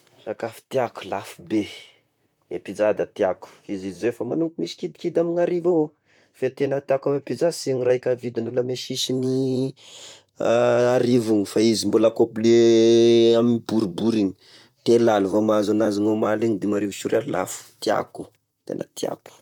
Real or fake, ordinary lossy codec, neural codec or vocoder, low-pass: fake; none; vocoder, 44.1 kHz, 128 mel bands, Pupu-Vocoder; 19.8 kHz